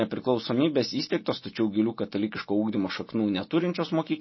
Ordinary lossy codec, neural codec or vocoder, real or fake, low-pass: MP3, 24 kbps; none; real; 7.2 kHz